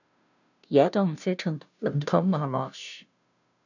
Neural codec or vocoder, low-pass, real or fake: codec, 16 kHz, 0.5 kbps, FunCodec, trained on Chinese and English, 25 frames a second; 7.2 kHz; fake